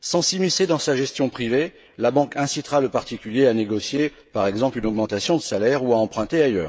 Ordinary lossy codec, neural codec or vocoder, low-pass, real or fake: none; codec, 16 kHz, 16 kbps, FreqCodec, smaller model; none; fake